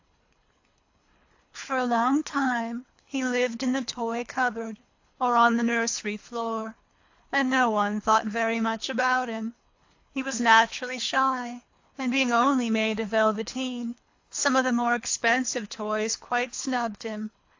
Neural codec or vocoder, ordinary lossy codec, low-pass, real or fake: codec, 24 kHz, 3 kbps, HILCodec; AAC, 48 kbps; 7.2 kHz; fake